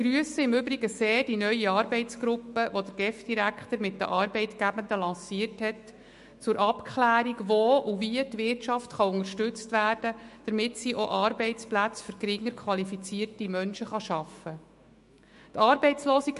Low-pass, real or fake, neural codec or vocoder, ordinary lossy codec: 14.4 kHz; fake; autoencoder, 48 kHz, 128 numbers a frame, DAC-VAE, trained on Japanese speech; MP3, 48 kbps